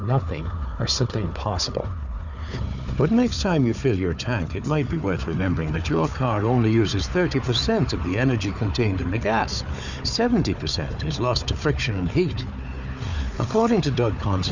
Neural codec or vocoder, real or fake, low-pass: codec, 16 kHz, 4 kbps, FunCodec, trained on Chinese and English, 50 frames a second; fake; 7.2 kHz